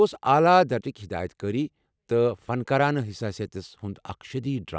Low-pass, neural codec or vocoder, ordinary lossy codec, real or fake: none; none; none; real